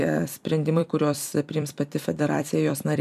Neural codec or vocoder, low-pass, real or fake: vocoder, 44.1 kHz, 128 mel bands every 256 samples, BigVGAN v2; 14.4 kHz; fake